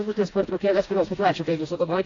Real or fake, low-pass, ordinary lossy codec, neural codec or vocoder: fake; 7.2 kHz; AAC, 32 kbps; codec, 16 kHz, 1 kbps, FreqCodec, smaller model